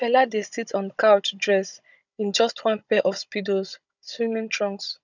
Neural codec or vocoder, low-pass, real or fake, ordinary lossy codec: codec, 16 kHz, 16 kbps, FunCodec, trained on Chinese and English, 50 frames a second; 7.2 kHz; fake; none